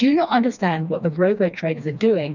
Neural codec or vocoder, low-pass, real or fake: codec, 16 kHz, 2 kbps, FreqCodec, smaller model; 7.2 kHz; fake